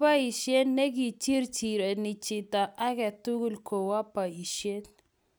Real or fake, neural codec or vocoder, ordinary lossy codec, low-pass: real; none; none; none